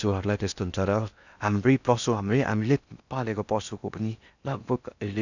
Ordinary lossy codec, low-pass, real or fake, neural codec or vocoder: none; 7.2 kHz; fake; codec, 16 kHz in and 24 kHz out, 0.6 kbps, FocalCodec, streaming, 4096 codes